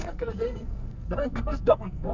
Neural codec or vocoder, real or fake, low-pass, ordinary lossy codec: codec, 44.1 kHz, 2.6 kbps, SNAC; fake; 7.2 kHz; none